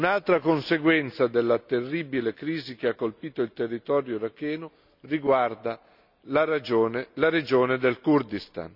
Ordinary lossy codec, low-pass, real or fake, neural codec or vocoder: none; 5.4 kHz; real; none